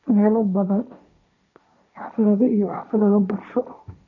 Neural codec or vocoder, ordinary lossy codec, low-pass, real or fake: codec, 16 kHz, 1.1 kbps, Voila-Tokenizer; none; 7.2 kHz; fake